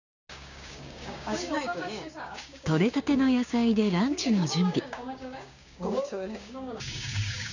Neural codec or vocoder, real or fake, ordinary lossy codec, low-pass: codec, 16 kHz, 6 kbps, DAC; fake; none; 7.2 kHz